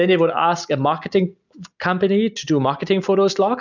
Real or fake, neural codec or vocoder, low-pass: real; none; 7.2 kHz